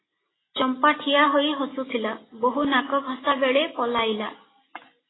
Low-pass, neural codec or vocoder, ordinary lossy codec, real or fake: 7.2 kHz; none; AAC, 16 kbps; real